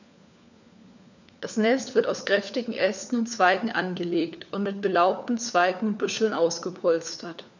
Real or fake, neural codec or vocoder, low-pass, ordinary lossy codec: fake; codec, 16 kHz, 4 kbps, FunCodec, trained on LibriTTS, 50 frames a second; 7.2 kHz; none